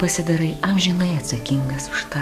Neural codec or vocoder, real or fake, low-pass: codec, 44.1 kHz, 7.8 kbps, DAC; fake; 14.4 kHz